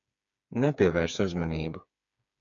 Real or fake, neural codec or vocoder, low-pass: fake; codec, 16 kHz, 4 kbps, FreqCodec, smaller model; 7.2 kHz